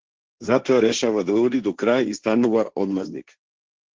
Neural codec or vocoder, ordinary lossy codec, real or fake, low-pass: codec, 16 kHz, 1.1 kbps, Voila-Tokenizer; Opus, 16 kbps; fake; 7.2 kHz